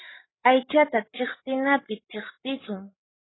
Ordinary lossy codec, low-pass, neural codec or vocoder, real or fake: AAC, 16 kbps; 7.2 kHz; codec, 16 kHz, 16 kbps, FreqCodec, larger model; fake